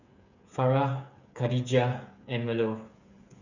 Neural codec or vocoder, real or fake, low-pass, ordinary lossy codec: codec, 16 kHz, 8 kbps, FreqCodec, smaller model; fake; 7.2 kHz; none